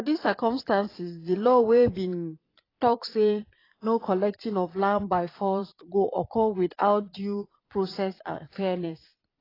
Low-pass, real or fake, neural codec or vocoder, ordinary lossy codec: 5.4 kHz; fake; vocoder, 22.05 kHz, 80 mel bands, Vocos; AAC, 24 kbps